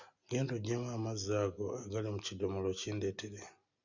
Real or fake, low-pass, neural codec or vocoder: real; 7.2 kHz; none